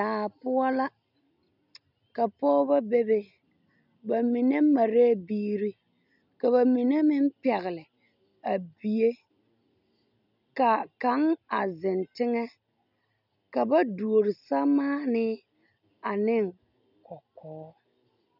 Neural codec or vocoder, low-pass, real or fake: none; 5.4 kHz; real